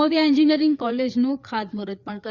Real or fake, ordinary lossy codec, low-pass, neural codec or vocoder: fake; Opus, 64 kbps; 7.2 kHz; codec, 16 kHz, 4 kbps, FreqCodec, larger model